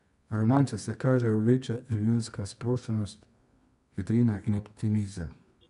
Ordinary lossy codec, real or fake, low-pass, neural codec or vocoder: none; fake; 10.8 kHz; codec, 24 kHz, 0.9 kbps, WavTokenizer, medium music audio release